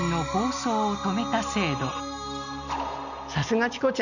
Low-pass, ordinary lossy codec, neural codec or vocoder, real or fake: 7.2 kHz; none; none; real